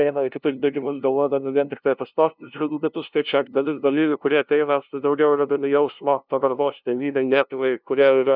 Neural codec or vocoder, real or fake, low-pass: codec, 16 kHz, 0.5 kbps, FunCodec, trained on LibriTTS, 25 frames a second; fake; 5.4 kHz